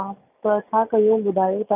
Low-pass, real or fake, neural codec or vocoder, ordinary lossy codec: 3.6 kHz; real; none; none